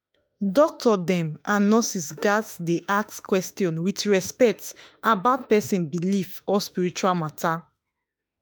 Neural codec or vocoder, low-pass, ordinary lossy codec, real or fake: autoencoder, 48 kHz, 32 numbers a frame, DAC-VAE, trained on Japanese speech; none; none; fake